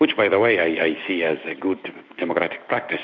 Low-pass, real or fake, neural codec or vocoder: 7.2 kHz; fake; autoencoder, 48 kHz, 128 numbers a frame, DAC-VAE, trained on Japanese speech